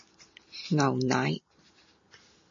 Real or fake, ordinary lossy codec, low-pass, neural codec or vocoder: real; MP3, 32 kbps; 7.2 kHz; none